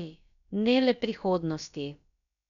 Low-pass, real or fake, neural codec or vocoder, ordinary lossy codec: 7.2 kHz; fake; codec, 16 kHz, about 1 kbps, DyCAST, with the encoder's durations; none